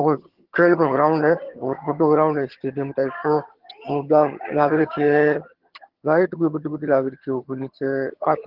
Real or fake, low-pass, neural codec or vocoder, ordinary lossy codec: fake; 5.4 kHz; vocoder, 22.05 kHz, 80 mel bands, HiFi-GAN; Opus, 16 kbps